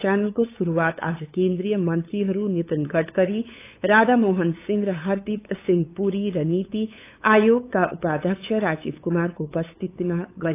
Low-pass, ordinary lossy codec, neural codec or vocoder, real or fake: 3.6 kHz; AAC, 24 kbps; codec, 16 kHz, 8 kbps, FunCodec, trained on LibriTTS, 25 frames a second; fake